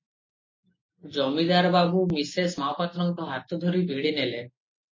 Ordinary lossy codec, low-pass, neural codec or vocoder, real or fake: MP3, 32 kbps; 7.2 kHz; none; real